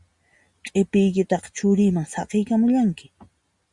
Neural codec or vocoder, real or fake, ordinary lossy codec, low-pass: none; real; Opus, 64 kbps; 10.8 kHz